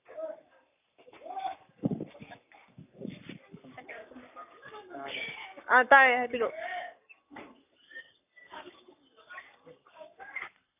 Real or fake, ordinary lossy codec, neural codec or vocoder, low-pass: fake; none; codec, 44.1 kHz, 7.8 kbps, Pupu-Codec; 3.6 kHz